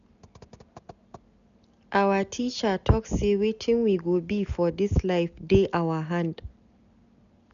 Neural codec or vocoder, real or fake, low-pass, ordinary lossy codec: none; real; 7.2 kHz; none